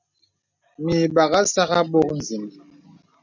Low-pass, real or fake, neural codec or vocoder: 7.2 kHz; real; none